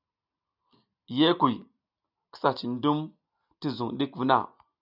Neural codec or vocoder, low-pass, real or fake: none; 5.4 kHz; real